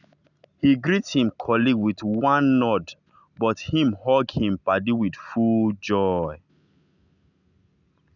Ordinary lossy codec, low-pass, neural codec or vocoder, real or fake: none; 7.2 kHz; none; real